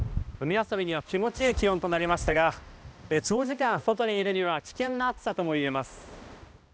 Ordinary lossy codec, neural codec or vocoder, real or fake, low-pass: none; codec, 16 kHz, 1 kbps, X-Codec, HuBERT features, trained on balanced general audio; fake; none